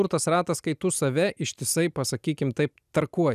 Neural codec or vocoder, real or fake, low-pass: none; real; 14.4 kHz